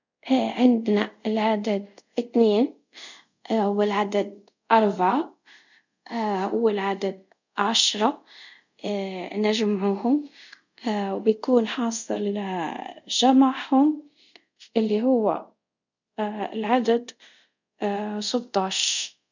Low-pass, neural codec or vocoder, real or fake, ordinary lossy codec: 7.2 kHz; codec, 24 kHz, 0.5 kbps, DualCodec; fake; none